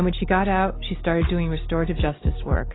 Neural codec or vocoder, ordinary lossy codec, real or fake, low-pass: none; AAC, 16 kbps; real; 7.2 kHz